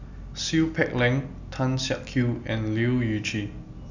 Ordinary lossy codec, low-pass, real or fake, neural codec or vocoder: none; 7.2 kHz; real; none